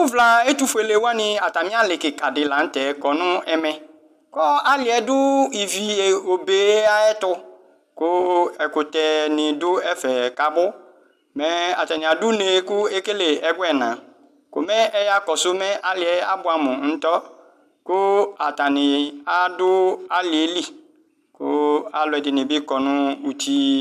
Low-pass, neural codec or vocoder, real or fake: 14.4 kHz; none; real